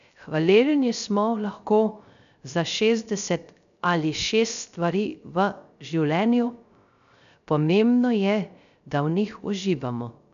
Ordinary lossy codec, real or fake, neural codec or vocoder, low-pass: none; fake; codec, 16 kHz, 0.3 kbps, FocalCodec; 7.2 kHz